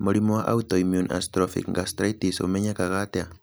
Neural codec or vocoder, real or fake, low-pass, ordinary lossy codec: none; real; none; none